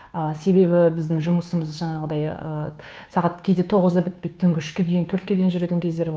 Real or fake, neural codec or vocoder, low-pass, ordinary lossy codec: fake; codec, 16 kHz, 2 kbps, FunCodec, trained on Chinese and English, 25 frames a second; none; none